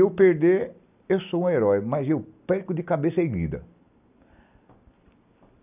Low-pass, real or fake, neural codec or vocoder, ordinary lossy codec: 3.6 kHz; real; none; none